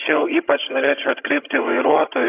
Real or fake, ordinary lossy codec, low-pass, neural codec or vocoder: fake; AAC, 24 kbps; 3.6 kHz; vocoder, 22.05 kHz, 80 mel bands, HiFi-GAN